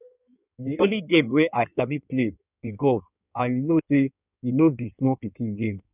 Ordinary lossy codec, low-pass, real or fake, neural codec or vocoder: none; 3.6 kHz; fake; codec, 16 kHz in and 24 kHz out, 1.1 kbps, FireRedTTS-2 codec